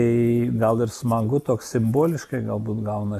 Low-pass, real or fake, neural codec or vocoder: 14.4 kHz; real; none